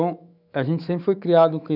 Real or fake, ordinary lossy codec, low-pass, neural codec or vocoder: fake; none; 5.4 kHz; autoencoder, 48 kHz, 128 numbers a frame, DAC-VAE, trained on Japanese speech